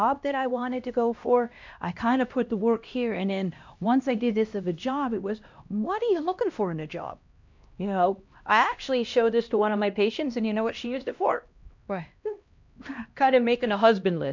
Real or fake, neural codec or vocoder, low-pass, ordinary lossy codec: fake; codec, 16 kHz, 1 kbps, X-Codec, HuBERT features, trained on LibriSpeech; 7.2 kHz; MP3, 64 kbps